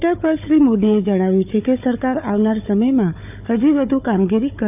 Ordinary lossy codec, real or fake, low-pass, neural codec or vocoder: none; fake; 3.6 kHz; codec, 16 kHz, 4 kbps, FunCodec, trained on Chinese and English, 50 frames a second